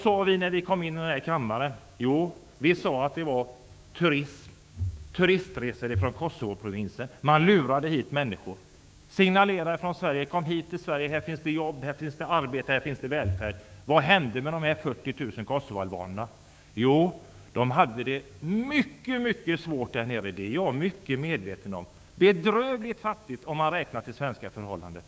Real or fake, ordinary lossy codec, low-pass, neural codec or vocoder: fake; none; none; codec, 16 kHz, 6 kbps, DAC